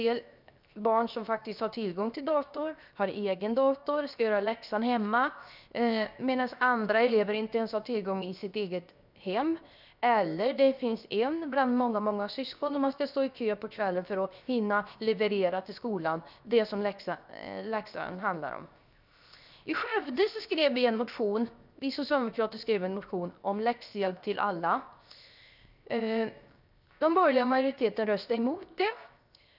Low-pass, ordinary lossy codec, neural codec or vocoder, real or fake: 5.4 kHz; none; codec, 16 kHz, 0.7 kbps, FocalCodec; fake